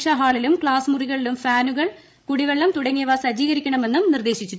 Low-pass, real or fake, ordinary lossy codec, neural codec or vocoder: none; fake; none; codec, 16 kHz, 16 kbps, FreqCodec, larger model